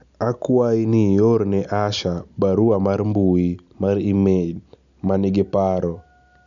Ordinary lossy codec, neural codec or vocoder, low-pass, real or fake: none; none; 7.2 kHz; real